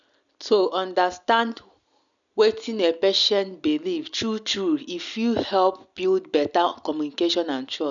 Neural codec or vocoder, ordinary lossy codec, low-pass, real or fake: none; none; 7.2 kHz; real